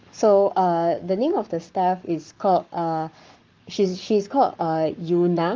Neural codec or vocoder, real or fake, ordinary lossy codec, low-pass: codec, 44.1 kHz, 7.8 kbps, Pupu-Codec; fake; Opus, 32 kbps; 7.2 kHz